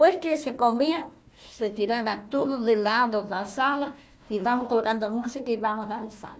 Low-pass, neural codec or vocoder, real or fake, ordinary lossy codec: none; codec, 16 kHz, 1 kbps, FunCodec, trained on Chinese and English, 50 frames a second; fake; none